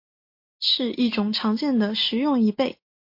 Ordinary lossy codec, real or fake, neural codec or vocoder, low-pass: MP3, 32 kbps; real; none; 5.4 kHz